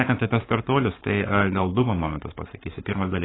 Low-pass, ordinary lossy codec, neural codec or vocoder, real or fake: 7.2 kHz; AAC, 16 kbps; codec, 44.1 kHz, 7.8 kbps, Pupu-Codec; fake